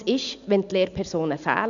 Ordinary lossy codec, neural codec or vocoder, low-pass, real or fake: none; none; 7.2 kHz; real